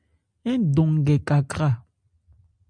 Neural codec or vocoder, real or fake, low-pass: none; real; 9.9 kHz